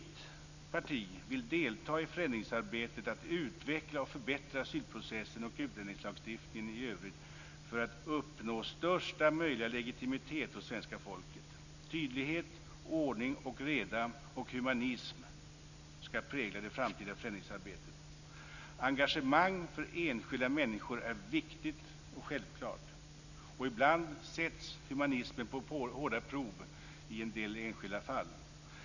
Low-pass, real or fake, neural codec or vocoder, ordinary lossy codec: 7.2 kHz; real; none; none